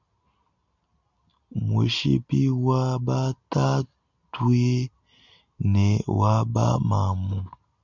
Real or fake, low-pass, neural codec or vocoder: real; 7.2 kHz; none